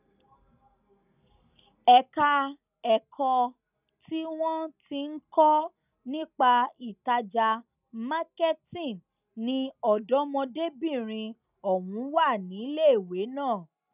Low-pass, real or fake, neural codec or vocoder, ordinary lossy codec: 3.6 kHz; real; none; none